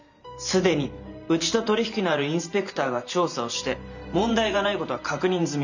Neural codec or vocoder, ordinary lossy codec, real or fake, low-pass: vocoder, 44.1 kHz, 128 mel bands every 512 samples, BigVGAN v2; none; fake; 7.2 kHz